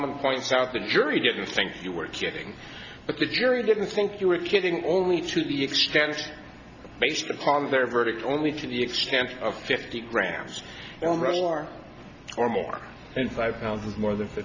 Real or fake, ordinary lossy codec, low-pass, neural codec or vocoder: real; Opus, 64 kbps; 7.2 kHz; none